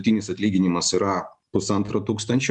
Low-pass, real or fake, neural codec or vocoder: 10.8 kHz; real; none